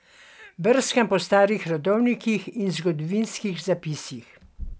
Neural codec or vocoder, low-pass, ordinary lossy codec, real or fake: none; none; none; real